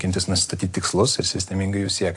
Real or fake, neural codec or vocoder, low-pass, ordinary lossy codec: real; none; 10.8 kHz; AAC, 64 kbps